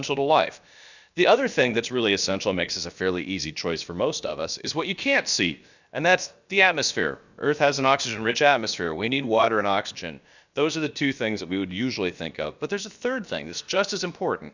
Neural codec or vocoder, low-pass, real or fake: codec, 16 kHz, about 1 kbps, DyCAST, with the encoder's durations; 7.2 kHz; fake